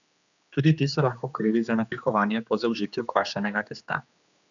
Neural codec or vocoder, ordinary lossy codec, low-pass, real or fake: codec, 16 kHz, 2 kbps, X-Codec, HuBERT features, trained on general audio; none; 7.2 kHz; fake